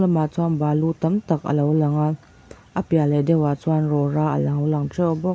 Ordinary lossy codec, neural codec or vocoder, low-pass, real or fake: none; none; none; real